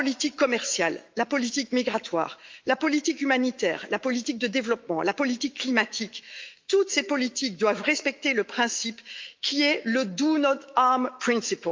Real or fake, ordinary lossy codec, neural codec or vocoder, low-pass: fake; Opus, 32 kbps; autoencoder, 48 kHz, 128 numbers a frame, DAC-VAE, trained on Japanese speech; 7.2 kHz